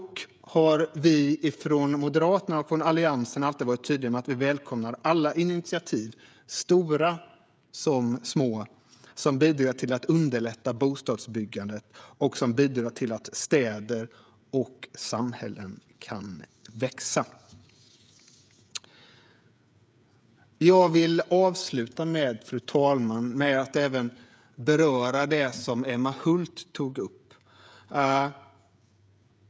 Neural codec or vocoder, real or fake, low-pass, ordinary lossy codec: codec, 16 kHz, 16 kbps, FreqCodec, smaller model; fake; none; none